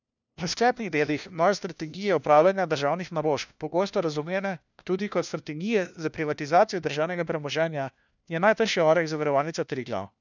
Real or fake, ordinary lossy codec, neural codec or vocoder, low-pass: fake; none; codec, 16 kHz, 1 kbps, FunCodec, trained on LibriTTS, 50 frames a second; 7.2 kHz